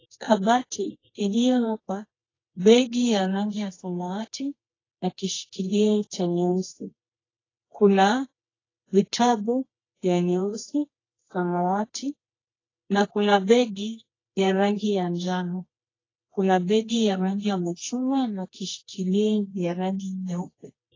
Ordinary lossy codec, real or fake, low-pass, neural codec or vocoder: AAC, 32 kbps; fake; 7.2 kHz; codec, 24 kHz, 0.9 kbps, WavTokenizer, medium music audio release